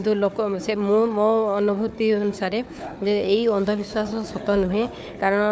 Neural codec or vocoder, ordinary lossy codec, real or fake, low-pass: codec, 16 kHz, 4 kbps, FunCodec, trained on Chinese and English, 50 frames a second; none; fake; none